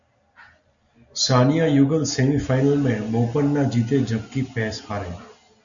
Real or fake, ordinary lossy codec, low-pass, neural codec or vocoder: real; MP3, 96 kbps; 7.2 kHz; none